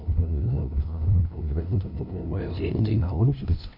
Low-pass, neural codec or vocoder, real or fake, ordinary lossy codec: 5.4 kHz; codec, 16 kHz, 0.5 kbps, FunCodec, trained on LibriTTS, 25 frames a second; fake; none